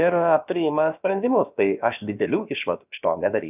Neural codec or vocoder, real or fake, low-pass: codec, 16 kHz, about 1 kbps, DyCAST, with the encoder's durations; fake; 3.6 kHz